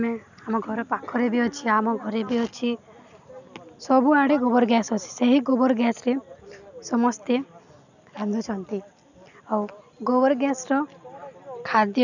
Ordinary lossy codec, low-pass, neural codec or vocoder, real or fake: none; 7.2 kHz; none; real